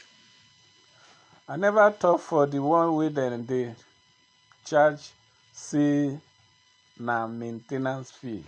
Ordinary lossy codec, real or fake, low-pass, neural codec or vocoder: none; real; 9.9 kHz; none